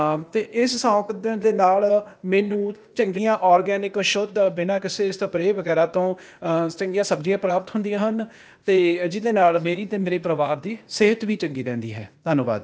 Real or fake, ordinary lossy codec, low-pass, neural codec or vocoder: fake; none; none; codec, 16 kHz, 0.8 kbps, ZipCodec